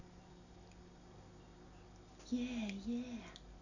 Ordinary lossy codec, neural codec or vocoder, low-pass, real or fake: none; none; 7.2 kHz; real